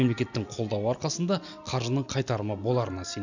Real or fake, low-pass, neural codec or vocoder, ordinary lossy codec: real; 7.2 kHz; none; none